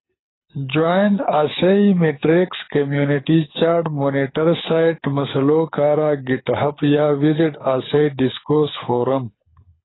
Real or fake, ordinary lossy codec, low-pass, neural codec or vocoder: fake; AAC, 16 kbps; 7.2 kHz; codec, 24 kHz, 6 kbps, HILCodec